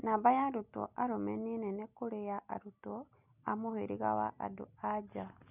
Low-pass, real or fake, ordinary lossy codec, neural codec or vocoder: 3.6 kHz; real; none; none